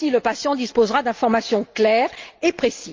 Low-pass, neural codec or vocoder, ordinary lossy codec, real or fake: 7.2 kHz; none; Opus, 32 kbps; real